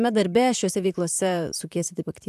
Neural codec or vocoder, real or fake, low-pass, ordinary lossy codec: vocoder, 44.1 kHz, 128 mel bands, Pupu-Vocoder; fake; 14.4 kHz; AAC, 96 kbps